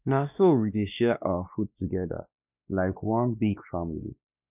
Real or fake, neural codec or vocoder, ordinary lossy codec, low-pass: fake; codec, 16 kHz, 2 kbps, X-Codec, WavLM features, trained on Multilingual LibriSpeech; none; 3.6 kHz